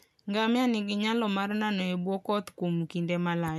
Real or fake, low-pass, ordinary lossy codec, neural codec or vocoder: real; 14.4 kHz; none; none